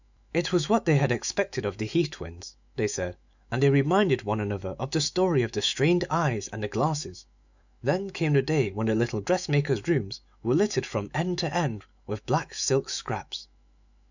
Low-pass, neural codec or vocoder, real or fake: 7.2 kHz; autoencoder, 48 kHz, 128 numbers a frame, DAC-VAE, trained on Japanese speech; fake